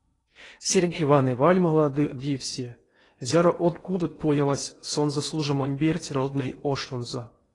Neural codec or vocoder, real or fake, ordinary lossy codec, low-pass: codec, 16 kHz in and 24 kHz out, 0.8 kbps, FocalCodec, streaming, 65536 codes; fake; AAC, 32 kbps; 10.8 kHz